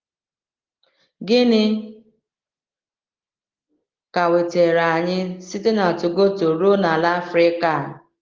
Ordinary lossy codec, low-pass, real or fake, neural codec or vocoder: Opus, 16 kbps; 7.2 kHz; real; none